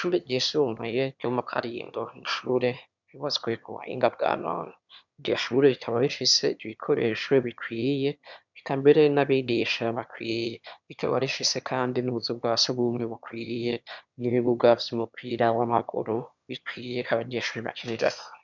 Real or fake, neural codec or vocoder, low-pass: fake; autoencoder, 22.05 kHz, a latent of 192 numbers a frame, VITS, trained on one speaker; 7.2 kHz